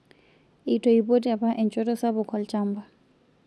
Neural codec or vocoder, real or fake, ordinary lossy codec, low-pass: none; real; none; none